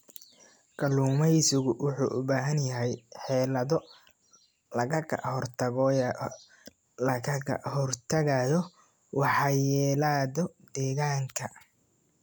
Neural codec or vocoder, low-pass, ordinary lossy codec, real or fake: none; none; none; real